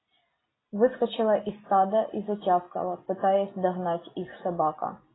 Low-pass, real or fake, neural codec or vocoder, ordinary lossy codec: 7.2 kHz; real; none; AAC, 16 kbps